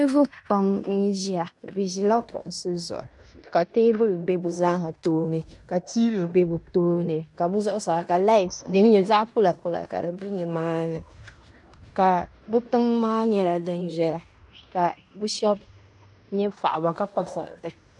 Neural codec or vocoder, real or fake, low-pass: codec, 16 kHz in and 24 kHz out, 0.9 kbps, LongCat-Audio-Codec, fine tuned four codebook decoder; fake; 10.8 kHz